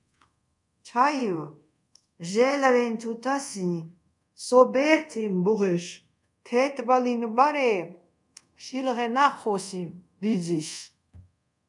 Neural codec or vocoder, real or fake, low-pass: codec, 24 kHz, 0.5 kbps, DualCodec; fake; 10.8 kHz